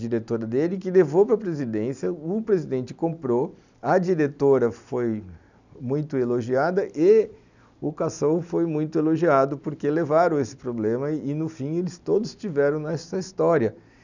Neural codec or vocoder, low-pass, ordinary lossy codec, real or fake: none; 7.2 kHz; none; real